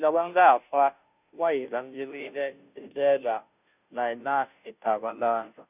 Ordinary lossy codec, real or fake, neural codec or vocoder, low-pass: none; fake; codec, 16 kHz, 0.5 kbps, FunCodec, trained on Chinese and English, 25 frames a second; 3.6 kHz